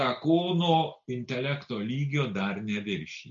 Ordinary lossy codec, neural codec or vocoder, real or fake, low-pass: MP3, 96 kbps; none; real; 7.2 kHz